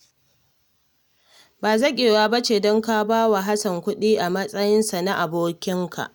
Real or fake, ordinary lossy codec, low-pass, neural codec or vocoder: real; none; none; none